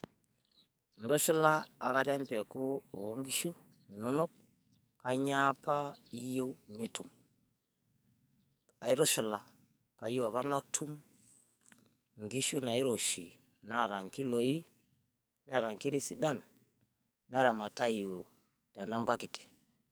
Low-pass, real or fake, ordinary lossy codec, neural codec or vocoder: none; fake; none; codec, 44.1 kHz, 2.6 kbps, SNAC